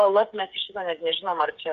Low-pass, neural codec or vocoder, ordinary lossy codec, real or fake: 7.2 kHz; codec, 16 kHz, 8 kbps, FreqCodec, smaller model; Opus, 64 kbps; fake